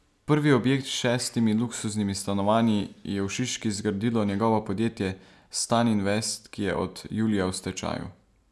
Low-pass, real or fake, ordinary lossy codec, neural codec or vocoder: none; real; none; none